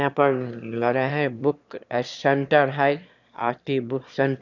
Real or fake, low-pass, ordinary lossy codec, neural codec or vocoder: fake; 7.2 kHz; none; autoencoder, 22.05 kHz, a latent of 192 numbers a frame, VITS, trained on one speaker